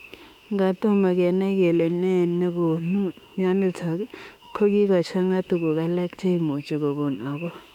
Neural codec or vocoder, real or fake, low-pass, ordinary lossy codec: autoencoder, 48 kHz, 32 numbers a frame, DAC-VAE, trained on Japanese speech; fake; 19.8 kHz; none